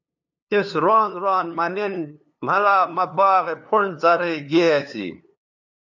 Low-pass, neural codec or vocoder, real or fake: 7.2 kHz; codec, 16 kHz, 2 kbps, FunCodec, trained on LibriTTS, 25 frames a second; fake